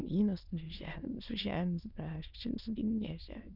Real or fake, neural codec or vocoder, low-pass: fake; autoencoder, 22.05 kHz, a latent of 192 numbers a frame, VITS, trained on many speakers; 5.4 kHz